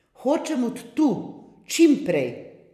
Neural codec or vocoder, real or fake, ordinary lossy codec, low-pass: vocoder, 44.1 kHz, 128 mel bands every 256 samples, BigVGAN v2; fake; MP3, 96 kbps; 14.4 kHz